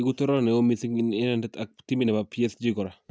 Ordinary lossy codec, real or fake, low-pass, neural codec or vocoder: none; real; none; none